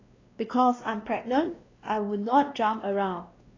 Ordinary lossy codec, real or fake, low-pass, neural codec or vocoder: AAC, 32 kbps; fake; 7.2 kHz; codec, 16 kHz, 1 kbps, X-Codec, WavLM features, trained on Multilingual LibriSpeech